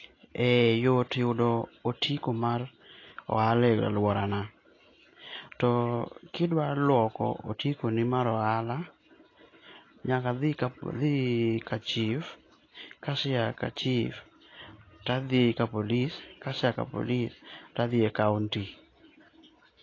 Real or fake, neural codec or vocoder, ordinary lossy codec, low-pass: real; none; AAC, 32 kbps; 7.2 kHz